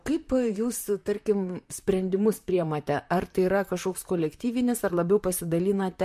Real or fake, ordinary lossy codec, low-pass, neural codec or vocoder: fake; MP3, 64 kbps; 14.4 kHz; vocoder, 44.1 kHz, 128 mel bands, Pupu-Vocoder